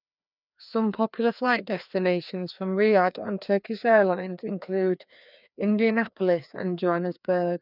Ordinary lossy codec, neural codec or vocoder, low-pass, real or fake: none; codec, 16 kHz, 2 kbps, FreqCodec, larger model; 5.4 kHz; fake